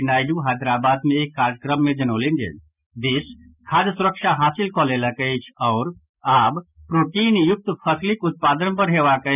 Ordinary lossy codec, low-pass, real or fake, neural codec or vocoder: none; 3.6 kHz; real; none